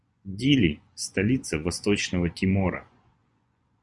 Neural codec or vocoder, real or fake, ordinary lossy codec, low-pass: vocoder, 24 kHz, 100 mel bands, Vocos; fake; Opus, 64 kbps; 10.8 kHz